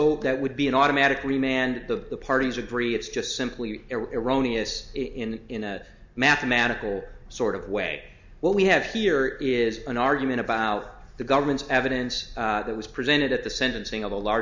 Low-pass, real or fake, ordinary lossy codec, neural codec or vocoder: 7.2 kHz; real; MP3, 64 kbps; none